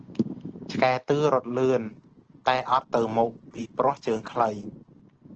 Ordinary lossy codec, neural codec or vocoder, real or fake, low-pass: Opus, 16 kbps; none; real; 7.2 kHz